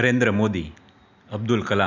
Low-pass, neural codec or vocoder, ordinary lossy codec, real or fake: 7.2 kHz; none; none; real